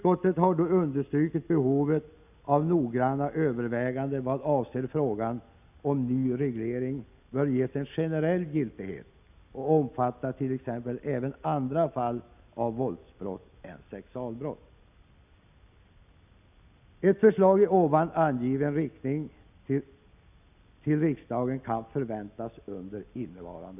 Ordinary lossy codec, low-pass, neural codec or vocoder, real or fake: none; 3.6 kHz; none; real